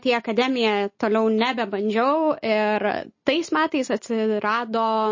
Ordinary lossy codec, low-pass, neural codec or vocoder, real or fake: MP3, 32 kbps; 7.2 kHz; none; real